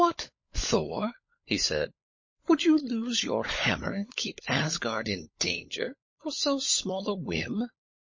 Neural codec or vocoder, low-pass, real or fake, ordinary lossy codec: codec, 16 kHz, 16 kbps, FunCodec, trained on LibriTTS, 50 frames a second; 7.2 kHz; fake; MP3, 32 kbps